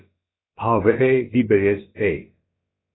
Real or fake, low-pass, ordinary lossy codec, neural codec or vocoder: fake; 7.2 kHz; AAC, 16 kbps; codec, 16 kHz, about 1 kbps, DyCAST, with the encoder's durations